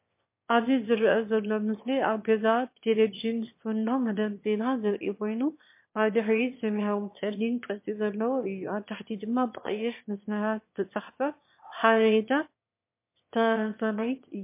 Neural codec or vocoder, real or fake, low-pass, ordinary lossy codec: autoencoder, 22.05 kHz, a latent of 192 numbers a frame, VITS, trained on one speaker; fake; 3.6 kHz; MP3, 24 kbps